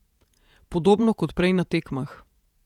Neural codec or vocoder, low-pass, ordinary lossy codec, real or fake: vocoder, 48 kHz, 128 mel bands, Vocos; 19.8 kHz; none; fake